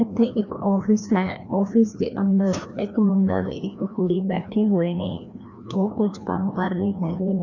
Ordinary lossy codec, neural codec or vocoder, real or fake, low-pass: none; codec, 16 kHz, 1 kbps, FreqCodec, larger model; fake; 7.2 kHz